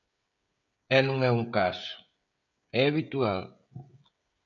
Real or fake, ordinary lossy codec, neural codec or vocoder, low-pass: fake; MP3, 64 kbps; codec, 16 kHz, 16 kbps, FreqCodec, smaller model; 7.2 kHz